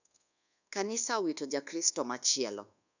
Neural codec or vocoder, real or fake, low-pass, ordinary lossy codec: codec, 24 kHz, 1.2 kbps, DualCodec; fake; 7.2 kHz; none